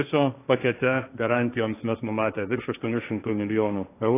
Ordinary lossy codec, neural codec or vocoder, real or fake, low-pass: AAC, 24 kbps; codec, 16 kHz, 1.1 kbps, Voila-Tokenizer; fake; 3.6 kHz